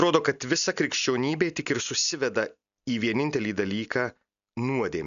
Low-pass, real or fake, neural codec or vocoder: 7.2 kHz; real; none